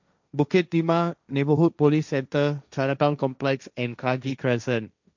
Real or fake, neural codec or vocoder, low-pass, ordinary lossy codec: fake; codec, 16 kHz, 1.1 kbps, Voila-Tokenizer; 7.2 kHz; none